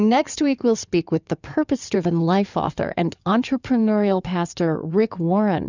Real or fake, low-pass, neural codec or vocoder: fake; 7.2 kHz; codec, 16 kHz in and 24 kHz out, 2.2 kbps, FireRedTTS-2 codec